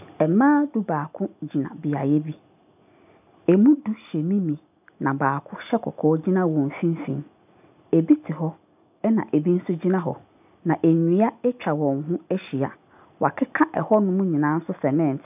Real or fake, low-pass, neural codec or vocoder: real; 3.6 kHz; none